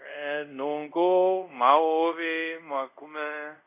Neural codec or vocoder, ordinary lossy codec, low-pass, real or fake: codec, 24 kHz, 0.5 kbps, DualCodec; MP3, 24 kbps; 3.6 kHz; fake